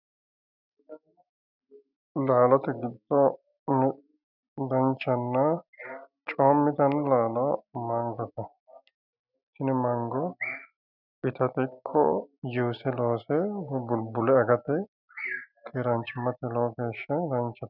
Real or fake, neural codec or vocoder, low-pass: real; none; 5.4 kHz